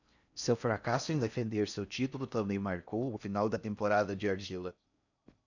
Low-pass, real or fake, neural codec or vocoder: 7.2 kHz; fake; codec, 16 kHz in and 24 kHz out, 0.6 kbps, FocalCodec, streaming, 4096 codes